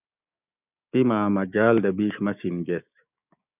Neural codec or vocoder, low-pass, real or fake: codec, 16 kHz, 6 kbps, DAC; 3.6 kHz; fake